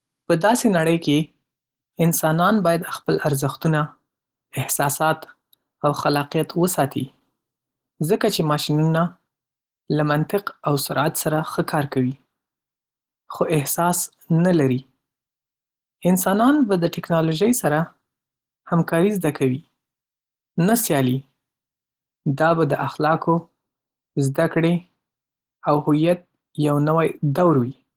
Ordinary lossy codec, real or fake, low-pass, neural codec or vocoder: Opus, 16 kbps; real; 19.8 kHz; none